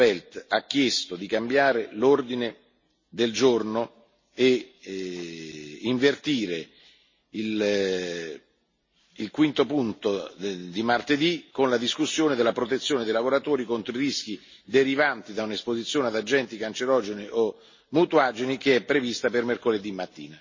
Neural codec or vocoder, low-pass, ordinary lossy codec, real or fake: none; 7.2 kHz; MP3, 32 kbps; real